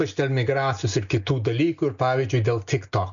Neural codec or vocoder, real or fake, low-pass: none; real; 7.2 kHz